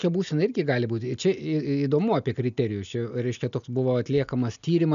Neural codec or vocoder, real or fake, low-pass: none; real; 7.2 kHz